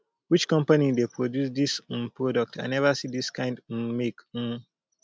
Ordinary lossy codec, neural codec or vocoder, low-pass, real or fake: none; none; none; real